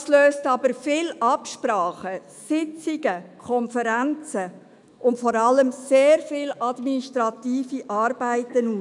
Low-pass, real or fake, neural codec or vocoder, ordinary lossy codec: 10.8 kHz; fake; autoencoder, 48 kHz, 128 numbers a frame, DAC-VAE, trained on Japanese speech; none